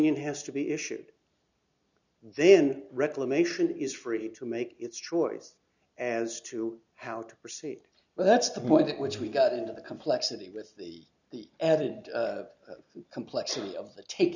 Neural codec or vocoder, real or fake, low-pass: none; real; 7.2 kHz